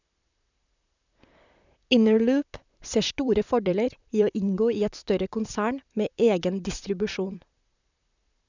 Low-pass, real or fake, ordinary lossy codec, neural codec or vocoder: 7.2 kHz; fake; none; vocoder, 44.1 kHz, 128 mel bands, Pupu-Vocoder